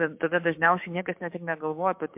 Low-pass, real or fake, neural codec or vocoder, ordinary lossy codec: 3.6 kHz; fake; codec, 16 kHz, 6 kbps, DAC; MP3, 32 kbps